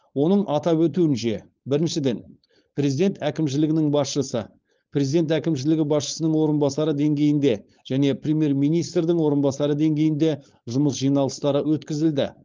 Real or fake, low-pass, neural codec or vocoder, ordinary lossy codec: fake; 7.2 kHz; codec, 16 kHz, 4.8 kbps, FACodec; Opus, 24 kbps